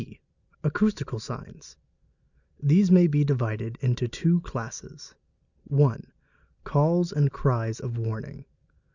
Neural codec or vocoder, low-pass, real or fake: none; 7.2 kHz; real